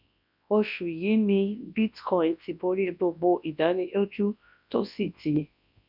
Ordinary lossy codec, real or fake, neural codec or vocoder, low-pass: none; fake; codec, 24 kHz, 0.9 kbps, WavTokenizer, large speech release; 5.4 kHz